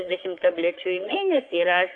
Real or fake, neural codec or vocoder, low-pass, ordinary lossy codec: fake; codec, 44.1 kHz, 3.4 kbps, Pupu-Codec; 9.9 kHz; AAC, 48 kbps